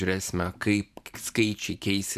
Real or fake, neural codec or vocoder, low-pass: fake; vocoder, 44.1 kHz, 128 mel bands every 512 samples, BigVGAN v2; 14.4 kHz